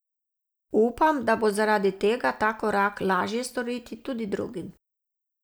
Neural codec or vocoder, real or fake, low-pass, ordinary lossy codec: none; real; none; none